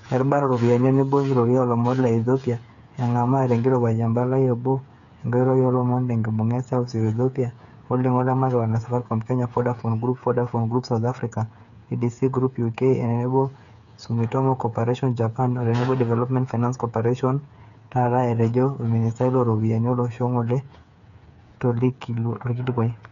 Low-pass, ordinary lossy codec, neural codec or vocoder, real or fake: 7.2 kHz; none; codec, 16 kHz, 8 kbps, FreqCodec, smaller model; fake